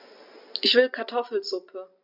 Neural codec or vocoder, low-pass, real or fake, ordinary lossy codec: none; 5.4 kHz; real; none